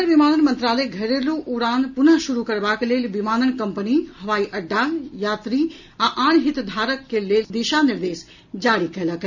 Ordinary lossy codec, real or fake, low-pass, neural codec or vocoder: none; real; 7.2 kHz; none